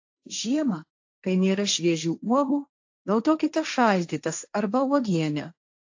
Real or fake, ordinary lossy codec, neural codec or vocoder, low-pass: fake; AAC, 48 kbps; codec, 16 kHz, 1.1 kbps, Voila-Tokenizer; 7.2 kHz